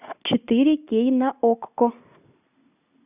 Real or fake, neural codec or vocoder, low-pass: real; none; 3.6 kHz